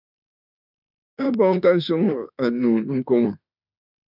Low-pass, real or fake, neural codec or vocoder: 5.4 kHz; fake; autoencoder, 48 kHz, 32 numbers a frame, DAC-VAE, trained on Japanese speech